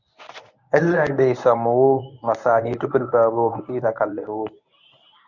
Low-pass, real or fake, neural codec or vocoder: 7.2 kHz; fake; codec, 24 kHz, 0.9 kbps, WavTokenizer, medium speech release version 1